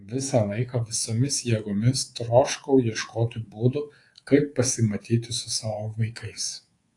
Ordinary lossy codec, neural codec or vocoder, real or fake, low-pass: AAC, 48 kbps; codec, 24 kHz, 3.1 kbps, DualCodec; fake; 10.8 kHz